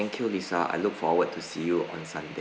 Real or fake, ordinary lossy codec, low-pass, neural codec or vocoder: real; none; none; none